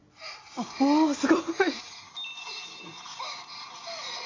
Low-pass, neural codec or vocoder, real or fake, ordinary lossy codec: 7.2 kHz; none; real; AAC, 32 kbps